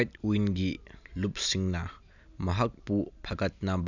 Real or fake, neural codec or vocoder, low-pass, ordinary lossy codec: real; none; 7.2 kHz; none